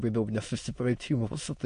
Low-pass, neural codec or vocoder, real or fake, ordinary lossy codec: 9.9 kHz; autoencoder, 22.05 kHz, a latent of 192 numbers a frame, VITS, trained on many speakers; fake; MP3, 48 kbps